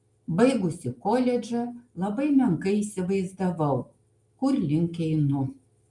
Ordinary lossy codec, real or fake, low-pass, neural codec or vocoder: Opus, 32 kbps; real; 10.8 kHz; none